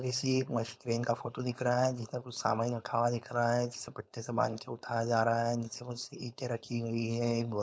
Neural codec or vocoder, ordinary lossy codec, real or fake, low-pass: codec, 16 kHz, 4.8 kbps, FACodec; none; fake; none